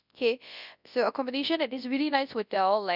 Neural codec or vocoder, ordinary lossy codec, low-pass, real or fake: codec, 24 kHz, 0.9 kbps, WavTokenizer, large speech release; none; 5.4 kHz; fake